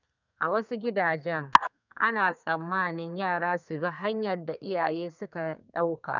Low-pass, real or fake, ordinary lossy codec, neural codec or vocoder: 7.2 kHz; fake; none; codec, 32 kHz, 1.9 kbps, SNAC